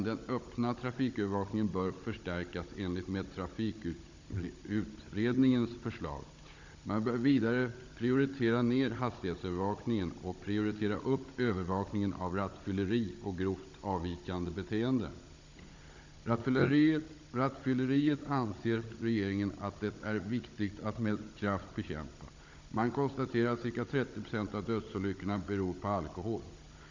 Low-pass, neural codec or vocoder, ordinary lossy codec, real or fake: 7.2 kHz; codec, 16 kHz, 16 kbps, FunCodec, trained on Chinese and English, 50 frames a second; MP3, 64 kbps; fake